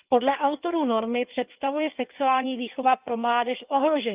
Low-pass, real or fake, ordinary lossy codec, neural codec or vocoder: 3.6 kHz; fake; Opus, 24 kbps; codec, 16 kHz in and 24 kHz out, 1.1 kbps, FireRedTTS-2 codec